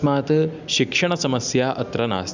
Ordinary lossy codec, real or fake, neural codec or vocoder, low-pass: none; real; none; 7.2 kHz